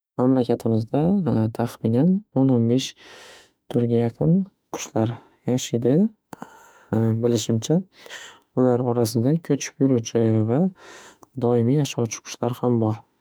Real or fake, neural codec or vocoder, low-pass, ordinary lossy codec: fake; autoencoder, 48 kHz, 32 numbers a frame, DAC-VAE, trained on Japanese speech; none; none